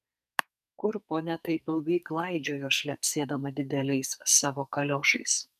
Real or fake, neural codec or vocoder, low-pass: fake; codec, 44.1 kHz, 2.6 kbps, SNAC; 14.4 kHz